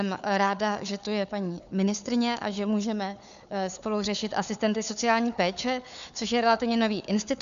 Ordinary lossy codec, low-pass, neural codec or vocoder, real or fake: MP3, 96 kbps; 7.2 kHz; codec, 16 kHz, 4 kbps, FunCodec, trained on Chinese and English, 50 frames a second; fake